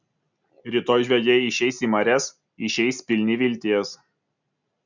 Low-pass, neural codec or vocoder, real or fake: 7.2 kHz; none; real